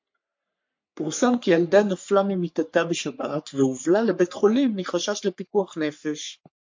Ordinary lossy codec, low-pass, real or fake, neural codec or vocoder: MP3, 48 kbps; 7.2 kHz; fake; codec, 44.1 kHz, 3.4 kbps, Pupu-Codec